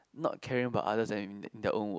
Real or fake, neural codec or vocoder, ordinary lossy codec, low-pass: real; none; none; none